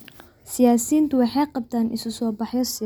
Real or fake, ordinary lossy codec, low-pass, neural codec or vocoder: real; none; none; none